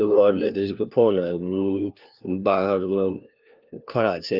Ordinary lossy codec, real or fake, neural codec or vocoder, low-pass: Opus, 24 kbps; fake; codec, 16 kHz, 1 kbps, FunCodec, trained on LibriTTS, 50 frames a second; 5.4 kHz